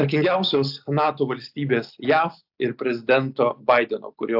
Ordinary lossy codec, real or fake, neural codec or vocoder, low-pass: AAC, 48 kbps; real; none; 5.4 kHz